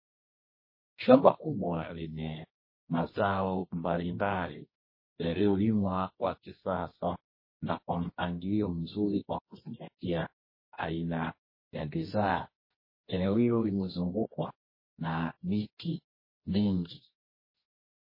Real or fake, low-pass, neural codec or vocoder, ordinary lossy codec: fake; 5.4 kHz; codec, 24 kHz, 0.9 kbps, WavTokenizer, medium music audio release; MP3, 24 kbps